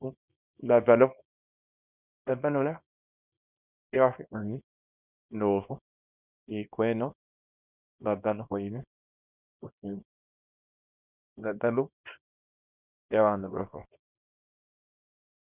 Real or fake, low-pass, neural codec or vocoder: fake; 3.6 kHz; codec, 24 kHz, 0.9 kbps, WavTokenizer, small release